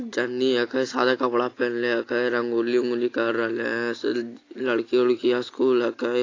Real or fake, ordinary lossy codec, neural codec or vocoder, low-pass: real; AAC, 32 kbps; none; 7.2 kHz